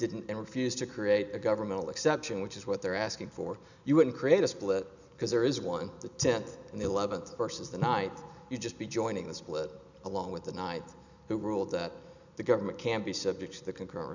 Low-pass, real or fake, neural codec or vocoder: 7.2 kHz; real; none